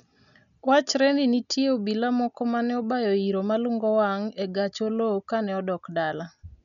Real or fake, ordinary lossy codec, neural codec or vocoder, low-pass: real; none; none; 7.2 kHz